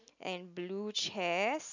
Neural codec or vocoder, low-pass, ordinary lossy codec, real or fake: none; 7.2 kHz; none; real